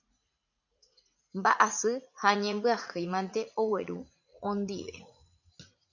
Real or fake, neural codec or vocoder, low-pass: fake; vocoder, 44.1 kHz, 80 mel bands, Vocos; 7.2 kHz